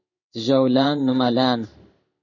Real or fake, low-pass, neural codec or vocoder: fake; 7.2 kHz; codec, 16 kHz in and 24 kHz out, 1 kbps, XY-Tokenizer